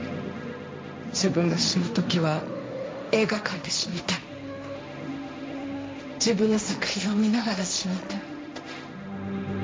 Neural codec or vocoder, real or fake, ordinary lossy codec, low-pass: codec, 16 kHz, 1.1 kbps, Voila-Tokenizer; fake; none; none